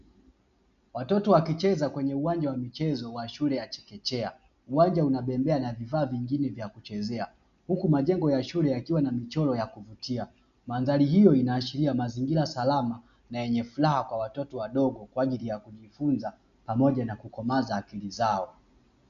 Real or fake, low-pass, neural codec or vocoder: real; 7.2 kHz; none